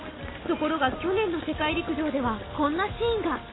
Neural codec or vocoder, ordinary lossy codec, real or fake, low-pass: none; AAC, 16 kbps; real; 7.2 kHz